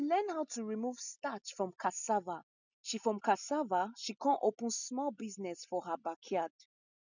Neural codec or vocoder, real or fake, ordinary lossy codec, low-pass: none; real; none; 7.2 kHz